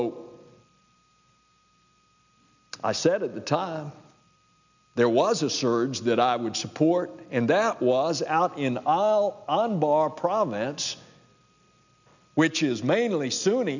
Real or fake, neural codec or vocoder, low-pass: real; none; 7.2 kHz